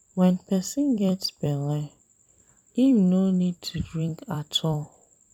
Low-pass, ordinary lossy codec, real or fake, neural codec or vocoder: 19.8 kHz; none; fake; vocoder, 44.1 kHz, 128 mel bands every 256 samples, BigVGAN v2